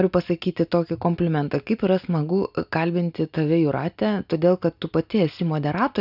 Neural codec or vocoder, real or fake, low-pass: none; real; 5.4 kHz